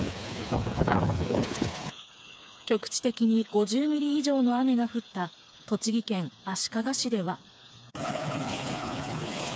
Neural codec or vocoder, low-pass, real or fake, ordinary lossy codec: codec, 16 kHz, 4 kbps, FreqCodec, smaller model; none; fake; none